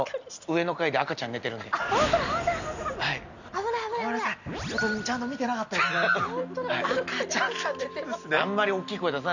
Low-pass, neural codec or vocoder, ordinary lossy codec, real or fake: 7.2 kHz; none; none; real